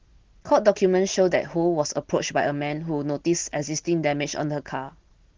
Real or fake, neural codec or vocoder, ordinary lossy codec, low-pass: real; none; Opus, 16 kbps; 7.2 kHz